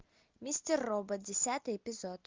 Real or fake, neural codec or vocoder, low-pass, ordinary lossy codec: real; none; 7.2 kHz; Opus, 32 kbps